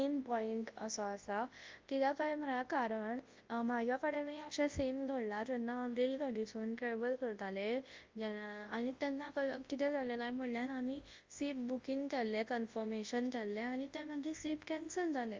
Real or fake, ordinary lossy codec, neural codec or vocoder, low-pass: fake; Opus, 32 kbps; codec, 24 kHz, 0.9 kbps, WavTokenizer, large speech release; 7.2 kHz